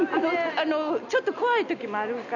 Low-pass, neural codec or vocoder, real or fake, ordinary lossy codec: 7.2 kHz; none; real; none